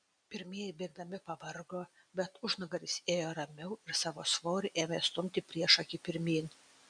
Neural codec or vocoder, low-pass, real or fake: none; 9.9 kHz; real